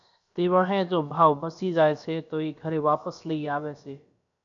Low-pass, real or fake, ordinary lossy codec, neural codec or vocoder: 7.2 kHz; fake; MP3, 96 kbps; codec, 16 kHz, about 1 kbps, DyCAST, with the encoder's durations